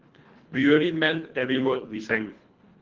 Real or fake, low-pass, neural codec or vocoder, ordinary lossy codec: fake; 7.2 kHz; codec, 24 kHz, 1.5 kbps, HILCodec; Opus, 16 kbps